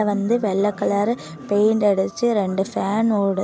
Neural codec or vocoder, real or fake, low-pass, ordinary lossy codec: none; real; none; none